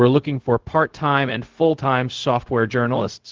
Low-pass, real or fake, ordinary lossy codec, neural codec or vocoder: 7.2 kHz; fake; Opus, 16 kbps; codec, 16 kHz, 0.4 kbps, LongCat-Audio-Codec